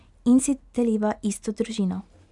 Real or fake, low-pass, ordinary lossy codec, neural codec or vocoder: real; 10.8 kHz; none; none